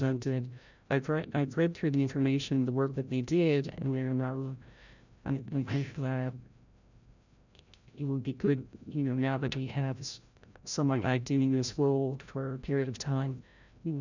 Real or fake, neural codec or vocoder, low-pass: fake; codec, 16 kHz, 0.5 kbps, FreqCodec, larger model; 7.2 kHz